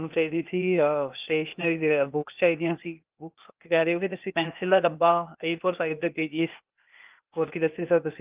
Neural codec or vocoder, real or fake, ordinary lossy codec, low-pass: codec, 16 kHz, 0.8 kbps, ZipCodec; fake; Opus, 32 kbps; 3.6 kHz